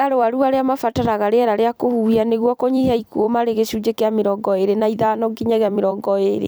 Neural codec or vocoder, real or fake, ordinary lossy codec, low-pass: vocoder, 44.1 kHz, 128 mel bands every 256 samples, BigVGAN v2; fake; none; none